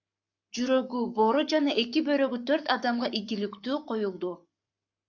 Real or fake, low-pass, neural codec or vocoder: fake; 7.2 kHz; codec, 44.1 kHz, 7.8 kbps, Pupu-Codec